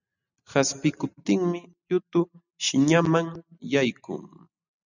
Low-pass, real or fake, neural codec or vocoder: 7.2 kHz; real; none